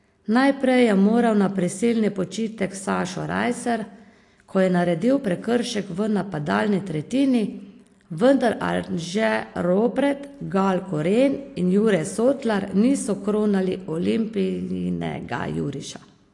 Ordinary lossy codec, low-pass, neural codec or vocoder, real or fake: AAC, 48 kbps; 10.8 kHz; none; real